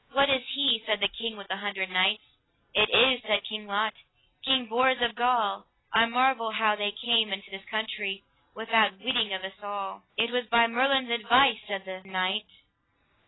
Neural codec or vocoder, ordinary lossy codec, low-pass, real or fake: none; AAC, 16 kbps; 7.2 kHz; real